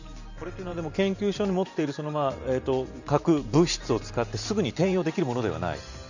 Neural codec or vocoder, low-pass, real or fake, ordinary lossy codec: vocoder, 44.1 kHz, 128 mel bands every 512 samples, BigVGAN v2; 7.2 kHz; fake; none